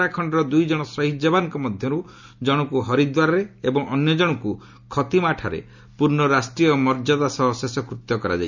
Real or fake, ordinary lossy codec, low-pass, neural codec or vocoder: real; none; 7.2 kHz; none